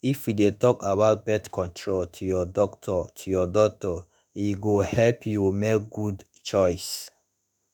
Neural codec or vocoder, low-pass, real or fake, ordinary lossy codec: autoencoder, 48 kHz, 32 numbers a frame, DAC-VAE, trained on Japanese speech; none; fake; none